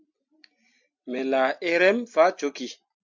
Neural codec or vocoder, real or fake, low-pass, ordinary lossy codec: none; real; 7.2 kHz; MP3, 64 kbps